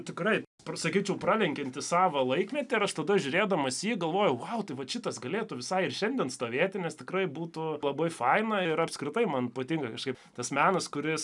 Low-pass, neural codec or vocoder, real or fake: 10.8 kHz; none; real